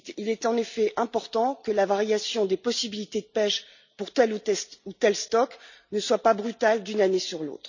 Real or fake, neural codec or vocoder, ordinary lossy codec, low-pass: real; none; none; 7.2 kHz